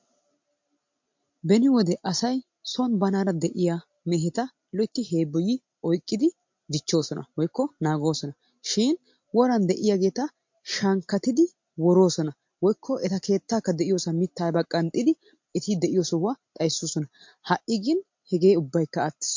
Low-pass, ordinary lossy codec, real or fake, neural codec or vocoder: 7.2 kHz; MP3, 48 kbps; real; none